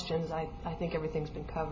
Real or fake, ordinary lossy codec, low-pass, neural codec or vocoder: real; MP3, 32 kbps; 7.2 kHz; none